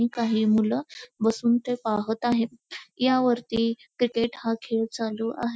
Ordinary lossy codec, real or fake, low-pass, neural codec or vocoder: none; real; none; none